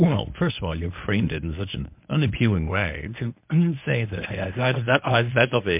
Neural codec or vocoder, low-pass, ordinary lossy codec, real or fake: codec, 24 kHz, 0.9 kbps, WavTokenizer, medium speech release version 1; 3.6 kHz; MP3, 32 kbps; fake